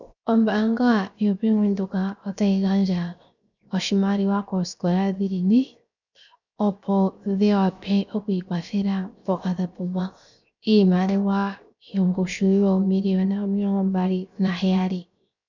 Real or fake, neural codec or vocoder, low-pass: fake; codec, 16 kHz, 0.7 kbps, FocalCodec; 7.2 kHz